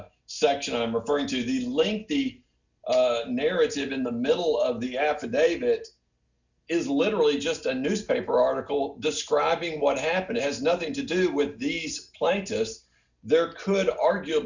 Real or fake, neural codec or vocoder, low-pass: real; none; 7.2 kHz